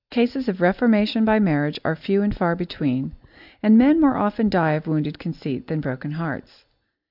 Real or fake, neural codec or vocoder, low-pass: real; none; 5.4 kHz